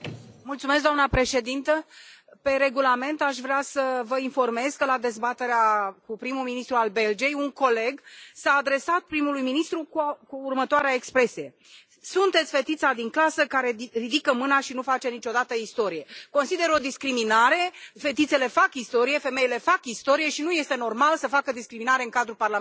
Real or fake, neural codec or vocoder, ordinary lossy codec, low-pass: real; none; none; none